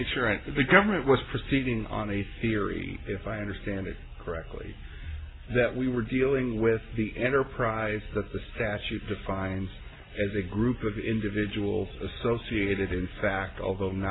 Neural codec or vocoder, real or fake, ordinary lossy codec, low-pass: none; real; AAC, 16 kbps; 7.2 kHz